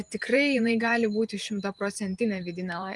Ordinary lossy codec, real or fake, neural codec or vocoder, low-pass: Opus, 32 kbps; fake; vocoder, 44.1 kHz, 128 mel bands every 512 samples, BigVGAN v2; 10.8 kHz